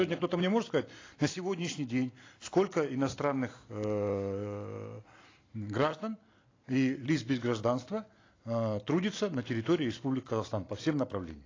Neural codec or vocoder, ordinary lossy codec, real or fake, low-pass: none; AAC, 32 kbps; real; 7.2 kHz